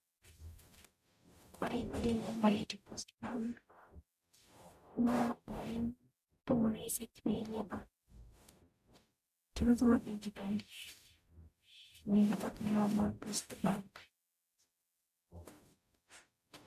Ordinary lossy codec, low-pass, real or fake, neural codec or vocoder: none; 14.4 kHz; fake; codec, 44.1 kHz, 0.9 kbps, DAC